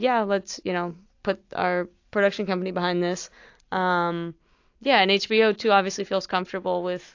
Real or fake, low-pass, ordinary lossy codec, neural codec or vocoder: real; 7.2 kHz; AAC, 48 kbps; none